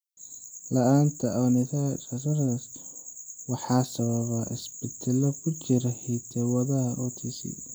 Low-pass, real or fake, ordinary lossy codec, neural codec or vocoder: none; real; none; none